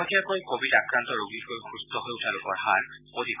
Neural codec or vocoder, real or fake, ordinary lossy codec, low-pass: none; real; none; 3.6 kHz